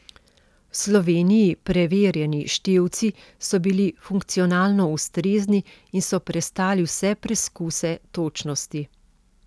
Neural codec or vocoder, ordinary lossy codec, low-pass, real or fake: none; none; none; real